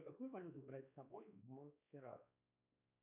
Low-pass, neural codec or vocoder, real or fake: 3.6 kHz; codec, 16 kHz, 2 kbps, X-Codec, WavLM features, trained on Multilingual LibriSpeech; fake